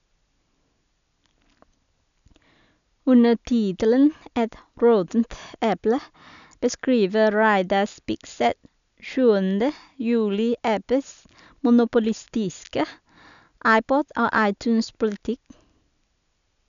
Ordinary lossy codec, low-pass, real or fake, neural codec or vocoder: none; 7.2 kHz; real; none